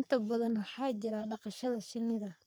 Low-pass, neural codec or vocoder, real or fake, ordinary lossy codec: none; codec, 44.1 kHz, 3.4 kbps, Pupu-Codec; fake; none